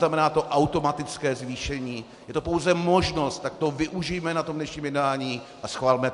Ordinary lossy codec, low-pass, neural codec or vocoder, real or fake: MP3, 64 kbps; 10.8 kHz; none; real